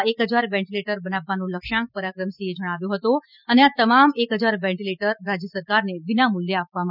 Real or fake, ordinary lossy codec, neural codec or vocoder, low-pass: real; none; none; 5.4 kHz